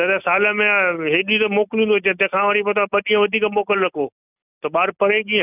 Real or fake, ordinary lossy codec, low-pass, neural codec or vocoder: real; none; 3.6 kHz; none